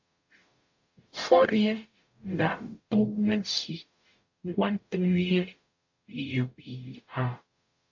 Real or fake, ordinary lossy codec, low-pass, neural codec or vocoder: fake; AAC, 48 kbps; 7.2 kHz; codec, 44.1 kHz, 0.9 kbps, DAC